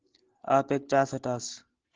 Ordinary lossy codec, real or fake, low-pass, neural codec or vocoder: Opus, 16 kbps; real; 7.2 kHz; none